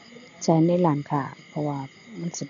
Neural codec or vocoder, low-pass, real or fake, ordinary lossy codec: none; 7.2 kHz; real; none